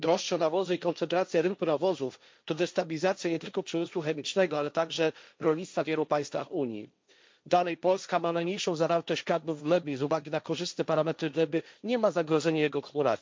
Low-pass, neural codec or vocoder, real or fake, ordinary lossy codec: none; codec, 16 kHz, 1.1 kbps, Voila-Tokenizer; fake; none